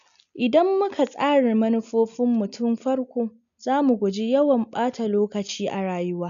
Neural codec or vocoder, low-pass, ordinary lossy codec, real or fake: none; 7.2 kHz; none; real